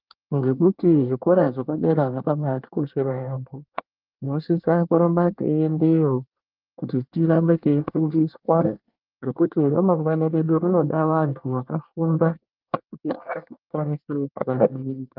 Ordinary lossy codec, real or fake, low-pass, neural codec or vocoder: Opus, 24 kbps; fake; 5.4 kHz; codec, 24 kHz, 1 kbps, SNAC